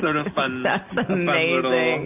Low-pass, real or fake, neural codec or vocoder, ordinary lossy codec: 3.6 kHz; real; none; AAC, 32 kbps